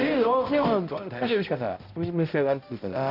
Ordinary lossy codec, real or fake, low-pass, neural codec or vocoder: none; fake; 5.4 kHz; codec, 16 kHz, 0.5 kbps, X-Codec, HuBERT features, trained on balanced general audio